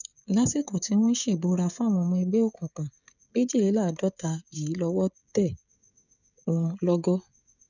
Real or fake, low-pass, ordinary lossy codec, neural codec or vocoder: fake; 7.2 kHz; none; codec, 16 kHz, 16 kbps, FreqCodec, smaller model